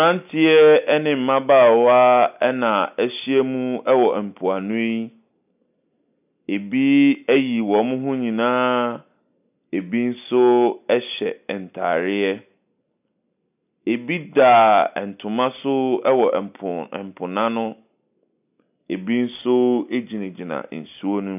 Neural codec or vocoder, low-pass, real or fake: none; 3.6 kHz; real